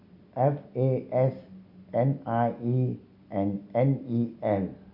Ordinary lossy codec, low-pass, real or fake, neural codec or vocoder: none; 5.4 kHz; real; none